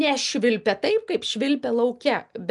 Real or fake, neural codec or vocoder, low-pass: real; none; 10.8 kHz